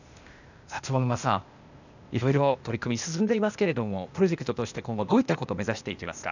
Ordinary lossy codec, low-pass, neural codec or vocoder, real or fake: none; 7.2 kHz; codec, 16 kHz, 0.8 kbps, ZipCodec; fake